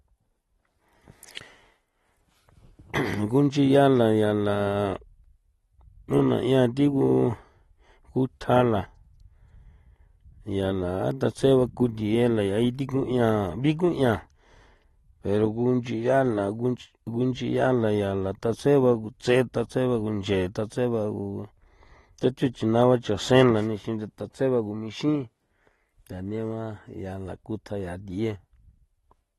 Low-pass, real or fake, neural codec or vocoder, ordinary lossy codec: 19.8 kHz; real; none; AAC, 32 kbps